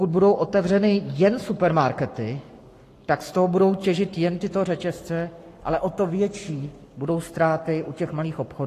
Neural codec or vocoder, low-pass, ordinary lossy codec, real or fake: codec, 44.1 kHz, 7.8 kbps, Pupu-Codec; 14.4 kHz; AAC, 48 kbps; fake